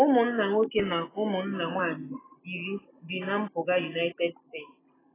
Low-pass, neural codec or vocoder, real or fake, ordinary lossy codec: 3.6 kHz; none; real; AAC, 16 kbps